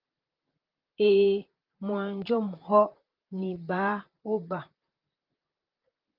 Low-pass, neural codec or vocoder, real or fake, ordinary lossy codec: 5.4 kHz; vocoder, 44.1 kHz, 128 mel bands, Pupu-Vocoder; fake; Opus, 32 kbps